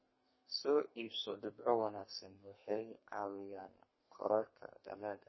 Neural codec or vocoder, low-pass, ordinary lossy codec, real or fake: codec, 32 kHz, 1.9 kbps, SNAC; 7.2 kHz; MP3, 24 kbps; fake